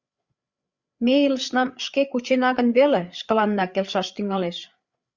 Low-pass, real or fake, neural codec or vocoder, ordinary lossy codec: 7.2 kHz; fake; codec, 16 kHz, 8 kbps, FreqCodec, larger model; Opus, 64 kbps